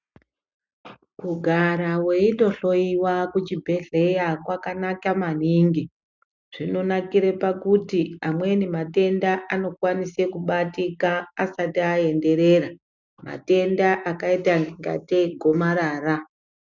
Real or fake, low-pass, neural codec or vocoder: real; 7.2 kHz; none